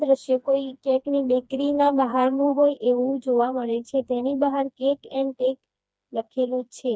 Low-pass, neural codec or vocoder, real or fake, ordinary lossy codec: none; codec, 16 kHz, 2 kbps, FreqCodec, smaller model; fake; none